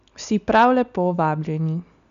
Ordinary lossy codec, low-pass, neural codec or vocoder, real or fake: none; 7.2 kHz; none; real